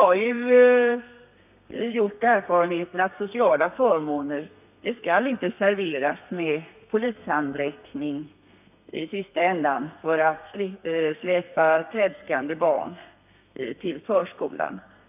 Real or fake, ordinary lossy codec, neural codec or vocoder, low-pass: fake; none; codec, 32 kHz, 1.9 kbps, SNAC; 3.6 kHz